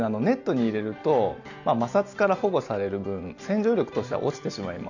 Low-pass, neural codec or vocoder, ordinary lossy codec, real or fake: 7.2 kHz; none; none; real